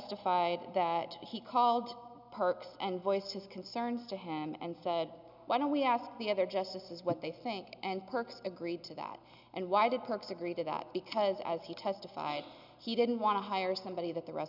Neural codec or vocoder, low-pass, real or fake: none; 5.4 kHz; real